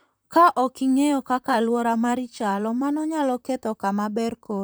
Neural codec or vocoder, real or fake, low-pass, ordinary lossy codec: vocoder, 44.1 kHz, 128 mel bands, Pupu-Vocoder; fake; none; none